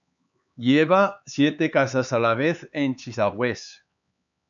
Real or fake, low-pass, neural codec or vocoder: fake; 7.2 kHz; codec, 16 kHz, 4 kbps, X-Codec, HuBERT features, trained on LibriSpeech